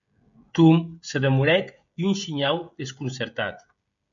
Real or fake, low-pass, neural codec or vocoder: fake; 7.2 kHz; codec, 16 kHz, 16 kbps, FreqCodec, smaller model